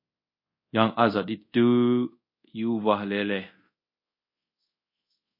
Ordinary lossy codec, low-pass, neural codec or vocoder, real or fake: MP3, 32 kbps; 5.4 kHz; codec, 24 kHz, 0.5 kbps, DualCodec; fake